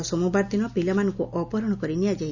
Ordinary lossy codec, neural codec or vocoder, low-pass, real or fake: none; none; 7.2 kHz; real